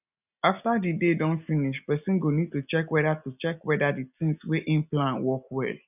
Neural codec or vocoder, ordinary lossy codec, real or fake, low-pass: none; none; real; 3.6 kHz